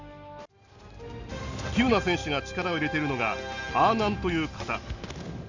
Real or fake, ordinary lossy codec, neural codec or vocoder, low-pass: real; Opus, 64 kbps; none; 7.2 kHz